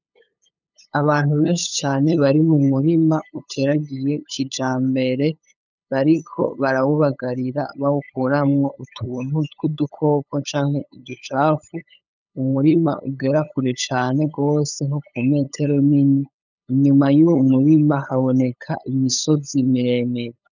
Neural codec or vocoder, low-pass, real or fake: codec, 16 kHz, 8 kbps, FunCodec, trained on LibriTTS, 25 frames a second; 7.2 kHz; fake